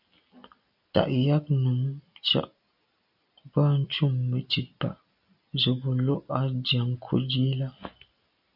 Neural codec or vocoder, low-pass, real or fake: none; 5.4 kHz; real